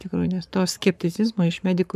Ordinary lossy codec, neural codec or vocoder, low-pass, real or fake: MP3, 96 kbps; codec, 44.1 kHz, 7.8 kbps, Pupu-Codec; 14.4 kHz; fake